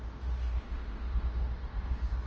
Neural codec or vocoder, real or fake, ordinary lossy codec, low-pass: codec, 16 kHz in and 24 kHz out, 0.9 kbps, LongCat-Audio-Codec, fine tuned four codebook decoder; fake; Opus, 24 kbps; 7.2 kHz